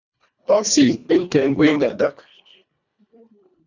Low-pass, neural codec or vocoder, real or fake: 7.2 kHz; codec, 24 kHz, 1.5 kbps, HILCodec; fake